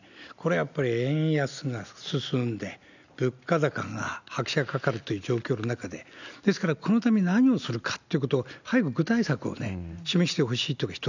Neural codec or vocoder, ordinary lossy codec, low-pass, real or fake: none; none; 7.2 kHz; real